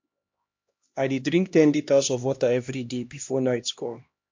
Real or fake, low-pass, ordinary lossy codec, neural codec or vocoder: fake; 7.2 kHz; MP3, 48 kbps; codec, 16 kHz, 1 kbps, X-Codec, HuBERT features, trained on LibriSpeech